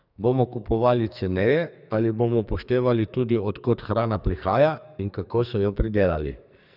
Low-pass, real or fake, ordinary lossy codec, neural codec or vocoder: 5.4 kHz; fake; none; codec, 44.1 kHz, 2.6 kbps, SNAC